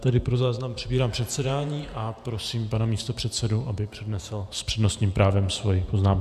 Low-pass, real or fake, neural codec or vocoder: 14.4 kHz; fake; vocoder, 48 kHz, 128 mel bands, Vocos